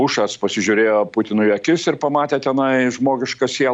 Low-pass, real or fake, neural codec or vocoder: 9.9 kHz; real; none